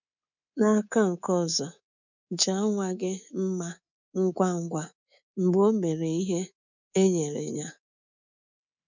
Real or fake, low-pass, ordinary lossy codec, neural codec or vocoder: fake; 7.2 kHz; none; codec, 24 kHz, 3.1 kbps, DualCodec